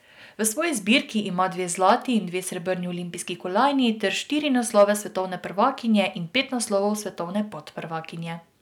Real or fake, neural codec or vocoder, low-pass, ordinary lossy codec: real; none; 19.8 kHz; none